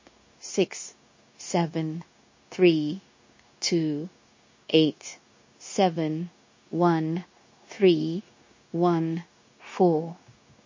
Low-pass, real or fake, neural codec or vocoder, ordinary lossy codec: 7.2 kHz; fake; codec, 16 kHz, 0.9 kbps, LongCat-Audio-Codec; MP3, 32 kbps